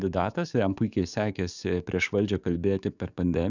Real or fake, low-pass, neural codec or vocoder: fake; 7.2 kHz; codec, 44.1 kHz, 7.8 kbps, DAC